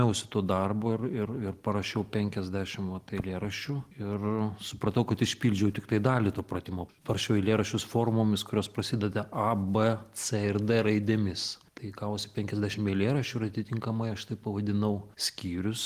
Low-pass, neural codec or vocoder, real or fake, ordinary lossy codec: 14.4 kHz; none; real; Opus, 24 kbps